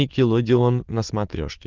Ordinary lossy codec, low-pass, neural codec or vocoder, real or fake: Opus, 16 kbps; 7.2 kHz; autoencoder, 22.05 kHz, a latent of 192 numbers a frame, VITS, trained on many speakers; fake